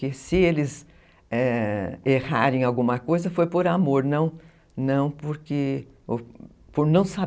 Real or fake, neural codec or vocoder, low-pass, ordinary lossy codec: real; none; none; none